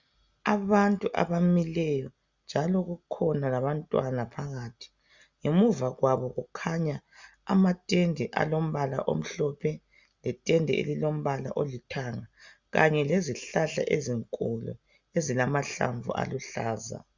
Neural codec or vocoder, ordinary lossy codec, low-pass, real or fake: none; Opus, 64 kbps; 7.2 kHz; real